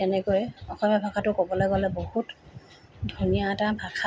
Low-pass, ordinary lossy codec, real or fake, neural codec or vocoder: none; none; real; none